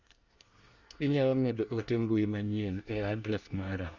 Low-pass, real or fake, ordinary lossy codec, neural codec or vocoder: 7.2 kHz; fake; Opus, 64 kbps; codec, 24 kHz, 1 kbps, SNAC